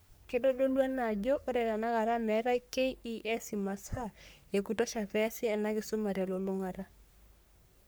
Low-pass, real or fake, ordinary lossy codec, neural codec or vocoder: none; fake; none; codec, 44.1 kHz, 3.4 kbps, Pupu-Codec